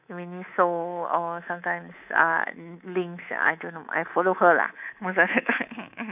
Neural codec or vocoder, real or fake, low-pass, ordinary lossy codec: codec, 24 kHz, 3.1 kbps, DualCodec; fake; 3.6 kHz; none